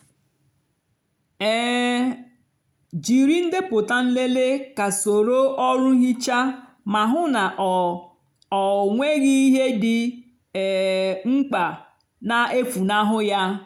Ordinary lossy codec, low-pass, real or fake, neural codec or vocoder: none; 19.8 kHz; real; none